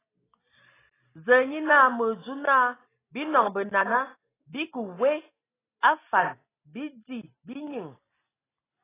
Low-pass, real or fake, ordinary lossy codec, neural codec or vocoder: 3.6 kHz; real; AAC, 16 kbps; none